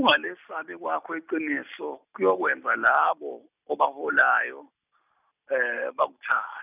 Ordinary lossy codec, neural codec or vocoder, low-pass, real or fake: none; none; 3.6 kHz; real